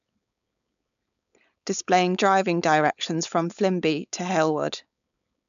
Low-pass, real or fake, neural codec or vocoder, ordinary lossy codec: 7.2 kHz; fake; codec, 16 kHz, 4.8 kbps, FACodec; none